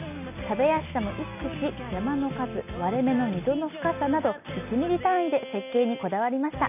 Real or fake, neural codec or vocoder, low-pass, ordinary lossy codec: real; none; 3.6 kHz; none